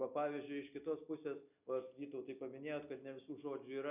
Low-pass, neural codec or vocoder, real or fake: 3.6 kHz; none; real